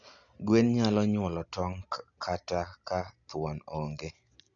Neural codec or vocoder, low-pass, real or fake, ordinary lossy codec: none; 7.2 kHz; real; none